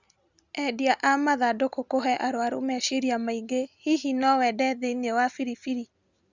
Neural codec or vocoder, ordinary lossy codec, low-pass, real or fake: none; Opus, 64 kbps; 7.2 kHz; real